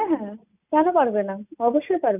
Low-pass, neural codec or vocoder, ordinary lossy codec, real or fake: 3.6 kHz; none; none; real